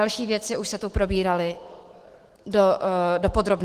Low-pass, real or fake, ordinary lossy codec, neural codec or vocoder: 14.4 kHz; fake; Opus, 16 kbps; autoencoder, 48 kHz, 128 numbers a frame, DAC-VAE, trained on Japanese speech